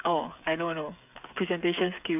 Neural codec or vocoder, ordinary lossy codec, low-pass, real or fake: codec, 16 kHz, 8 kbps, FreqCodec, smaller model; none; 3.6 kHz; fake